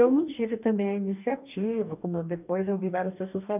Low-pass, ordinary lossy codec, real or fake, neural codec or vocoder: 3.6 kHz; none; fake; codec, 44.1 kHz, 2.6 kbps, DAC